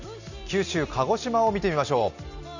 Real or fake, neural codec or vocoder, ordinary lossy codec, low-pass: real; none; none; 7.2 kHz